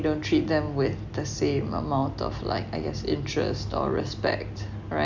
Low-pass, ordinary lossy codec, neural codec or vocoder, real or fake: 7.2 kHz; none; none; real